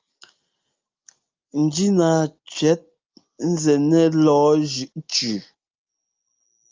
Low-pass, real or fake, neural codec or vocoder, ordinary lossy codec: 7.2 kHz; real; none; Opus, 24 kbps